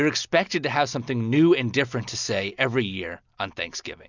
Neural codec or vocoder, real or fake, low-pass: none; real; 7.2 kHz